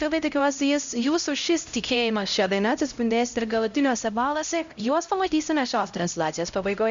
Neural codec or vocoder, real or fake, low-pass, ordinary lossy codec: codec, 16 kHz, 0.5 kbps, X-Codec, HuBERT features, trained on LibriSpeech; fake; 7.2 kHz; Opus, 64 kbps